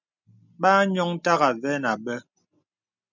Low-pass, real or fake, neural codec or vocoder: 7.2 kHz; real; none